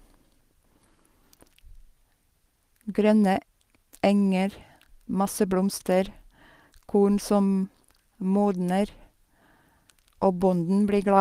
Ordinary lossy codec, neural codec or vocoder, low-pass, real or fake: Opus, 24 kbps; none; 14.4 kHz; real